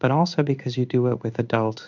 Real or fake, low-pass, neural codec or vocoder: fake; 7.2 kHz; codec, 16 kHz in and 24 kHz out, 1 kbps, XY-Tokenizer